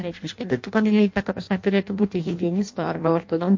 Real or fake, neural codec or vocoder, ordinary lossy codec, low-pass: fake; codec, 16 kHz in and 24 kHz out, 0.6 kbps, FireRedTTS-2 codec; MP3, 48 kbps; 7.2 kHz